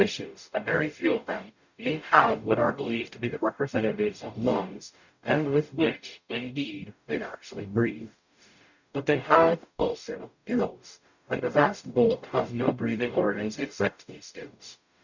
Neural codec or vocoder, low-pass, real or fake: codec, 44.1 kHz, 0.9 kbps, DAC; 7.2 kHz; fake